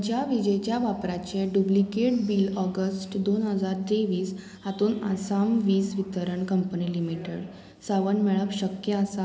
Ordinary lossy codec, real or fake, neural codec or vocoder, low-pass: none; real; none; none